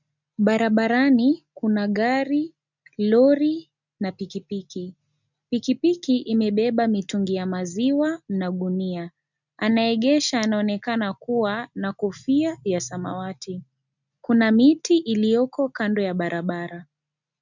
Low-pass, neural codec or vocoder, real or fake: 7.2 kHz; none; real